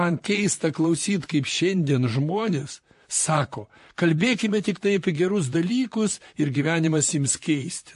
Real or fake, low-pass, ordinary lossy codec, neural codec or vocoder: fake; 14.4 kHz; MP3, 48 kbps; vocoder, 44.1 kHz, 128 mel bands, Pupu-Vocoder